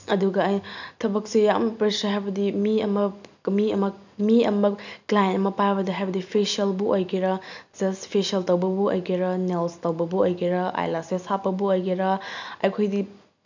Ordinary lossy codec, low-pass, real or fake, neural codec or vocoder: none; 7.2 kHz; real; none